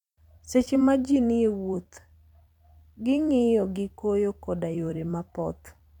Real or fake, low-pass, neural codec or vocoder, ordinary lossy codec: fake; 19.8 kHz; vocoder, 44.1 kHz, 128 mel bands every 256 samples, BigVGAN v2; none